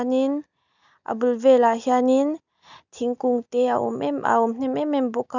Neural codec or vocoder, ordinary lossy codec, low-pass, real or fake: none; none; 7.2 kHz; real